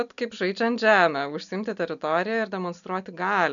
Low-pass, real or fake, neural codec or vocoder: 7.2 kHz; real; none